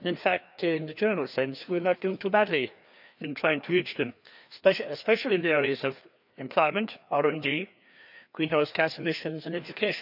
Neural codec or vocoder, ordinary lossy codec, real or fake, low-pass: codec, 16 kHz, 2 kbps, FreqCodec, larger model; none; fake; 5.4 kHz